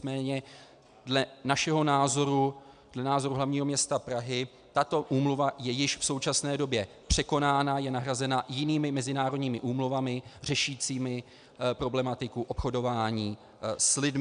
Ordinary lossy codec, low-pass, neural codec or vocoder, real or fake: AAC, 96 kbps; 9.9 kHz; none; real